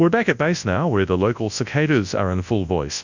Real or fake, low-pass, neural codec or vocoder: fake; 7.2 kHz; codec, 24 kHz, 0.9 kbps, WavTokenizer, large speech release